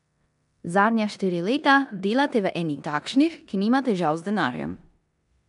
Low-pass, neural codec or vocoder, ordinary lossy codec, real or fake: 10.8 kHz; codec, 16 kHz in and 24 kHz out, 0.9 kbps, LongCat-Audio-Codec, four codebook decoder; none; fake